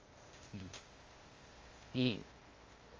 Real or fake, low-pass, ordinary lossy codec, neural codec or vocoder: fake; 7.2 kHz; Opus, 32 kbps; codec, 16 kHz, 0.8 kbps, ZipCodec